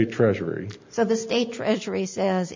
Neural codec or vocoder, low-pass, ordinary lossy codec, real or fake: none; 7.2 kHz; MP3, 64 kbps; real